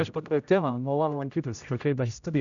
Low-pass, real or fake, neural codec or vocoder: 7.2 kHz; fake; codec, 16 kHz, 0.5 kbps, X-Codec, HuBERT features, trained on general audio